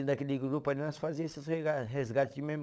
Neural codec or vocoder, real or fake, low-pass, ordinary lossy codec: codec, 16 kHz, 16 kbps, FunCodec, trained on LibriTTS, 50 frames a second; fake; none; none